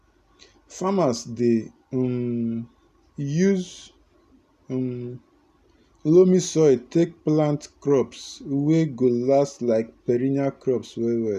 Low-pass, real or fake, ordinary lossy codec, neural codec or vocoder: 14.4 kHz; real; none; none